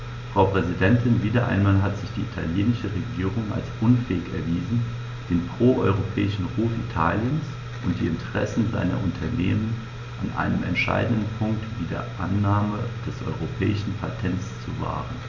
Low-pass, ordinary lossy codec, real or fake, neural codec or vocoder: 7.2 kHz; none; real; none